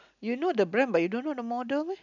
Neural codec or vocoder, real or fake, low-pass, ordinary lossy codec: none; real; 7.2 kHz; none